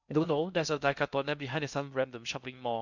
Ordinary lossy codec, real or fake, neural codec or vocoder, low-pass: none; fake; codec, 16 kHz in and 24 kHz out, 0.6 kbps, FocalCodec, streaming, 2048 codes; 7.2 kHz